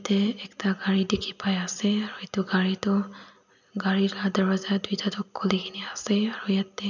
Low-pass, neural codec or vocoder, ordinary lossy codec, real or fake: 7.2 kHz; none; none; real